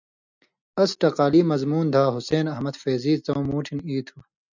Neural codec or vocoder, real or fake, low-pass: none; real; 7.2 kHz